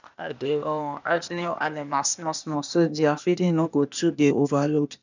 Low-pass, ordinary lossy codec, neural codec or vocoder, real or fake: 7.2 kHz; none; codec, 16 kHz, 0.8 kbps, ZipCodec; fake